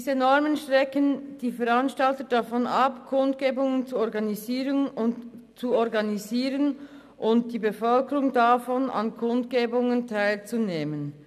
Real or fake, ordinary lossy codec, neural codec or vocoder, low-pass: real; none; none; 14.4 kHz